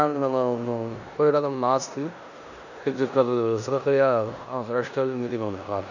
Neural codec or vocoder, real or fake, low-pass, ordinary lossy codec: codec, 16 kHz in and 24 kHz out, 0.9 kbps, LongCat-Audio-Codec, four codebook decoder; fake; 7.2 kHz; none